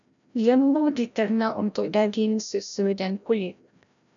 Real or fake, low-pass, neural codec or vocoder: fake; 7.2 kHz; codec, 16 kHz, 0.5 kbps, FreqCodec, larger model